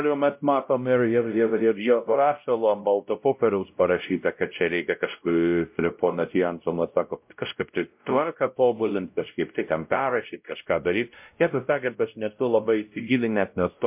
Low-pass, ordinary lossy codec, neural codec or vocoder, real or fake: 3.6 kHz; MP3, 32 kbps; codec, 16 kHz, 0.5 kbps, X-Codec, WavLM features, trained on Multilingual LibriSpeech; fake